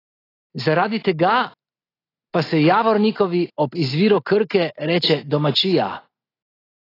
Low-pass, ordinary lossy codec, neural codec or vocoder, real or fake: 5.4 kHz; AAC, 24 kbps; none; real